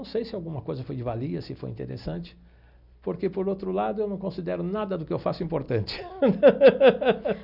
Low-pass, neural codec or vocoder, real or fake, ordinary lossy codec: 5.4 kHz; none; real; none